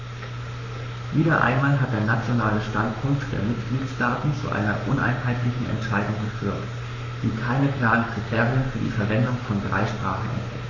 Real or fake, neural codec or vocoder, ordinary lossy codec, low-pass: fake; codec, 44.1 kHz, 7.8 kbps, Pupu-Codec; none; 7.2 kHz